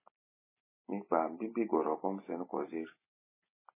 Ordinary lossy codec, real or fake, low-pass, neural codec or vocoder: MP3, 16 kbps; real; 3.6 kHz; none